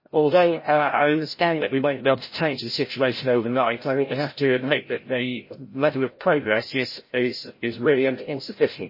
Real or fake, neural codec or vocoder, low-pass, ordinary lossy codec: fake; codec, 16 kHz, 0.5 kbps, FreqCodec, larger model; 5.4 kHz; MP3, 24 kbps